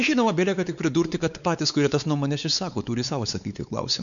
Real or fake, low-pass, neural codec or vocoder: fake; 7.2 kHz; codec, 16 kHz, 4 kbps, X-Codec, WavLM features, trained on Multilingual LibriSpeech